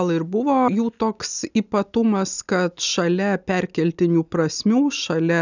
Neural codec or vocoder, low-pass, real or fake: none; 7.2 kHz; real